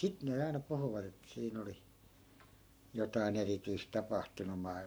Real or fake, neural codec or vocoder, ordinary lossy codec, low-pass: fake; codec, 44.1 kHz, 7.8 kbps, Pupu-Codec; none; none